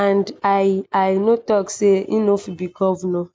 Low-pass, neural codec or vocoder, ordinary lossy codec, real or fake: none; none; none; real